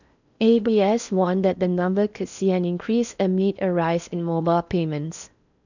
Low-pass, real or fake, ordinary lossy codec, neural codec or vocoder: 7.2 kHz; fake; none; codec, 16 kHz in and 24 kHz out, 0.6 kbps, FocalCodec, streaming, 4096 codes